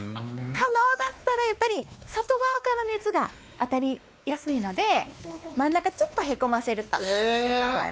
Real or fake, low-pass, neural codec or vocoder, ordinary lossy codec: fake; none; codec, 16 kHz, 2 kbps, X-Codec, WavLM features, trained on Multilingual LibriSpeech; none